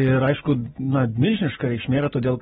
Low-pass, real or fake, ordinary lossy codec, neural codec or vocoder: 19.8 kHz; real; AAC, 16 kbps; none